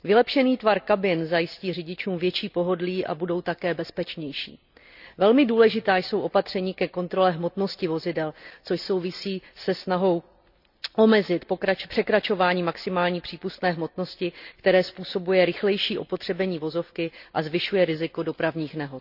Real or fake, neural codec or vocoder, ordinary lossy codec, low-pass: real; none; none; 5.4 kHz